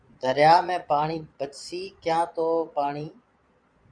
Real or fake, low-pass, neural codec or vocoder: fake; 9.9 kHz; vocoder, 22.05 kHz, 80 mel bands, Vocos